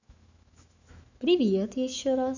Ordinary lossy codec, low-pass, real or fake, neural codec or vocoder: none; 7.2 kHz; fake; autoencoder, 48 kHz, 128 numbers a frame, DAC-VAE, trained on Japanese speech